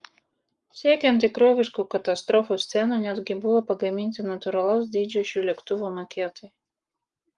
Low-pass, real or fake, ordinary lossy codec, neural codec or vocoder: 10.8 kHz; fake; Opus, 32 kbps; codec, 44.1 kHz, 7.8 kbps, Pupu-Codec